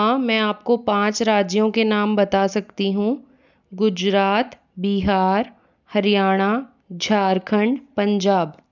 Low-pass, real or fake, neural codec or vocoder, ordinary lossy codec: 7.2 kHz; real; none; none